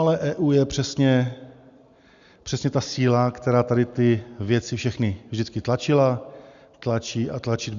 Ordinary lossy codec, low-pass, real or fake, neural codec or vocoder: Opus, 64 kbps; 7.2 kHz; real; none